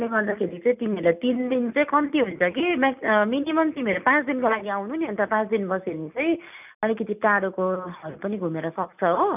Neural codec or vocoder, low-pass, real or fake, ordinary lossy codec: none; 3.6 kHz; real; none